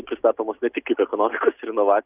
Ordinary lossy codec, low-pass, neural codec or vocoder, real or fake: Opus, 64 kbps; 3.6 kHz; none; real